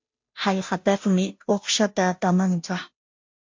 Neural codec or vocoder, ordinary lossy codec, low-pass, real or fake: codec, 16 kHz, 0.5 kbps, FunCodec, trained on Chinese and English, 25 frames a second; MP3, 48 kbps; 7.2 kHz; fake